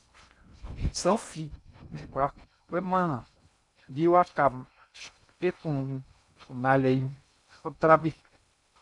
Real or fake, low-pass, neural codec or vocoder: fake; 10.8 kHz; codec, 16 kHz in and 24 kHz out, 0.6 kbps, FocalCodec, streaming, 2048 codes